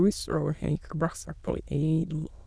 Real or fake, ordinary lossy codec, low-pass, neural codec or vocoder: fake; none; none; autoencoder, 22.05 kHz, a latent of 192 numbers a frame, VITS, trained on many speakers